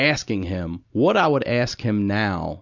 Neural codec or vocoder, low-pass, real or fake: none; 7.2 kHz; real